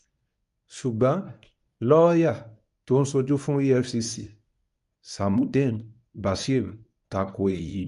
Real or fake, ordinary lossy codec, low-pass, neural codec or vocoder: fake; none; 10.8 kHz; codec, 24 kHz, 0.9 kbps, WavTokenizer, medium speech release version 1